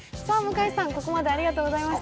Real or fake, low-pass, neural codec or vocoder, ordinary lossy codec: real; none; none; none